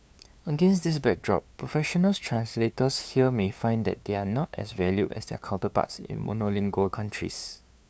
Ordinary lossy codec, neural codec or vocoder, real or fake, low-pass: none; codec, 16 kHz, 2 kbps, FunCodec, trained on LibriTTS, 25 frames a second; fake; none